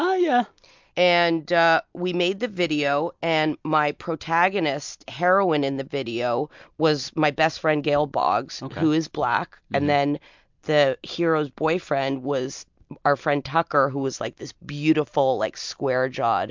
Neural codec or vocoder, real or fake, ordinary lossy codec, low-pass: none; real; MP3, 64 kbps; 7.2 kHz